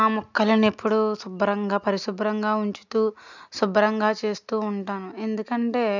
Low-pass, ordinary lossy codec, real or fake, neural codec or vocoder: 7.2 kHz; none; real; none